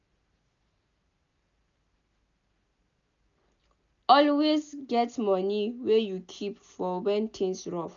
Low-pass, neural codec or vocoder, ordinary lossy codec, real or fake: 7.2 kHz; none; none; real